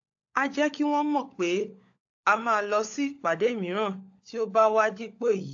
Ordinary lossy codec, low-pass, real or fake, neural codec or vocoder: AAC, 48 kbps; 7.2 kHz; fake; codec, 16 kHz, 16 kbps, FunCodec, trained on LibriTTS, 50 frames a second